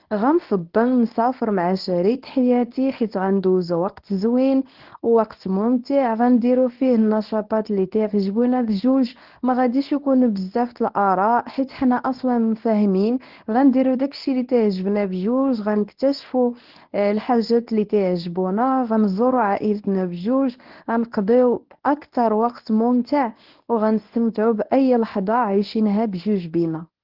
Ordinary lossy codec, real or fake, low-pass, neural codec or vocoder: Opus, 16 kbps; fake; 5.4 kHz; codec, 24 kHz, 0.9 kbps, WavTokenizer, medium speech release version 1